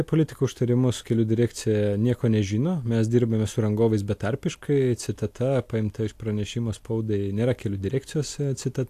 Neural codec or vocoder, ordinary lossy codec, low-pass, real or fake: none; AAC, 64 kbps; 14.4 kHz; real